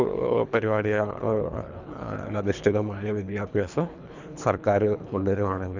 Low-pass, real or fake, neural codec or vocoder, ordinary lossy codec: 7.2 kHz; fake; codec, 24 kHz, 3 kbps, HILCodec; none